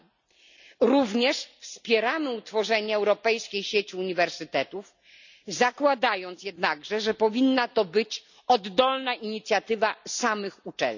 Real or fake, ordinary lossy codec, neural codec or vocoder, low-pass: real; none; none; 7.2 kHz